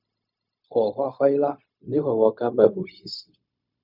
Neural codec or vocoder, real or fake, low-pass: codec, 16 kHz, 0.4 kbps, LongCat-Audio-Codec; fake; 5.4 kHz